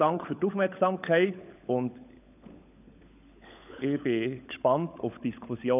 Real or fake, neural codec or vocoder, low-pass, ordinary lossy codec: fake; codec, 16 kHz, 16 kbps, FunCodec, trained on LibriTTS, 50 frames a second; 3.6 kHz; none